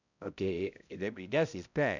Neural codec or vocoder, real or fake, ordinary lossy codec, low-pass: codec, 16 kHz, 0.5 kbps, X-Codec, HuBERT features, trained on balanced general audio; fake; none; 7.2 kHz